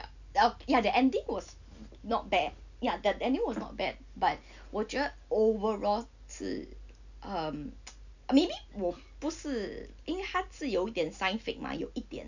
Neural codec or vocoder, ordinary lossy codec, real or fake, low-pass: none; none; real; 7.2 kHz